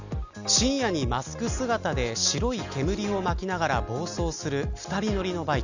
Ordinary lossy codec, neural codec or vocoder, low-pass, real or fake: none; none; 7.2 kHz; real